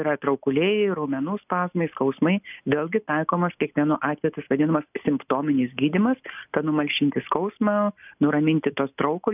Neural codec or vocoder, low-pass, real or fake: none; 3.6 kHz; real